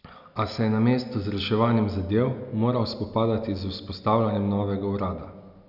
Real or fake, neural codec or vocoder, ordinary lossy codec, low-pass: real; none; none; 5.4 kHz